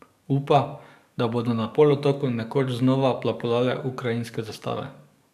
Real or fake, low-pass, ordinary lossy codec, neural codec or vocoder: fake; 14.4 kHz; none; codec, 44.1 kHz, 7.8 kbps, DAC